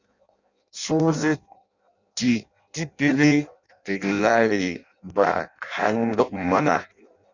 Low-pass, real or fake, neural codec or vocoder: 7.2 kHz; fake; codec, 16 kHz in and 24 kHz out, 0.6 kbps, FireRedTTS-2 codec